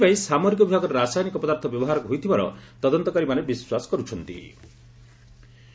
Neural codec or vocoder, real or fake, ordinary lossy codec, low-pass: none; real; none; none